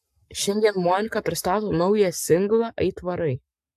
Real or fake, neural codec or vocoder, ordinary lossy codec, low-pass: fake; codec, 44.1 kHz, 7.8 kbps, Pupu-Codec; AAC, 96 kbps; 14.4 kHz